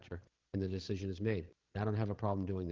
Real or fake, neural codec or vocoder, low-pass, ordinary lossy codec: fake; codec, 16 kHz, 16 kbps, FreqCodec, larger model; 7.2 kHz; Opus, 16 kbps